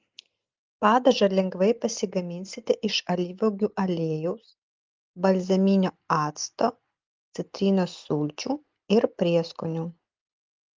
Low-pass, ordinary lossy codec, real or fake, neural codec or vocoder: 7.2 kHz; Opus, 32 kbps; real; none